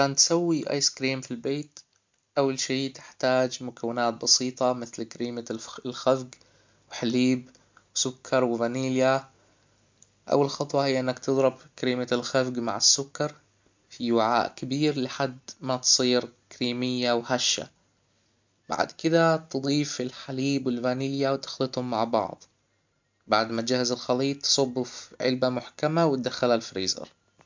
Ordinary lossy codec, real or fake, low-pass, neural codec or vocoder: MP3, 64 kbps; real; 7.2 kHz; none